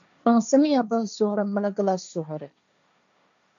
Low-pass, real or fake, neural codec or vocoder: 7.2 kHz; fake; codec, 16 kHz, 1.1 kbps, Voila-Tokenizer